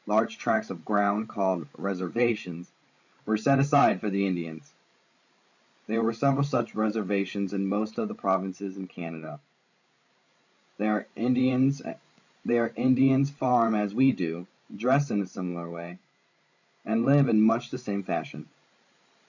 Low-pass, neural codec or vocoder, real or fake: 7.2 kHz; codec, 16 kHz, 16 kbps, FreqCodec, larger model; fake